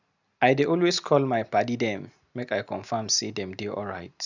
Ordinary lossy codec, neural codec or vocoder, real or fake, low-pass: none; none; real; 7.2 kHz